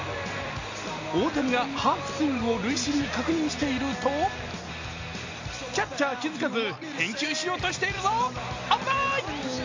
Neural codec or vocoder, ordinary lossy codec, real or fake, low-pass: none; AAC, 48 kbps; real; 7.2 kHz